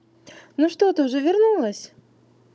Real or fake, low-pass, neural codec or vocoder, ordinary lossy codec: fake; none; codec, 16 kHz, 16 kbps, FunCodec, trained on Chinese and English, 50 frames a second; none